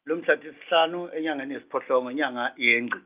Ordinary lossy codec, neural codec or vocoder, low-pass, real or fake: Opus, 24 kbps; none; 3.6 kHz; real